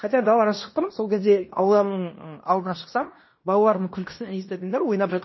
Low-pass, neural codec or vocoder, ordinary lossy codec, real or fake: 7.2 kHz; codec, 16 kHz in and 24 kHz out, 0.9 kbps, LongCat-Audio-Codec, fine tuned four codebook decoder; MP3, 24 kbps; fake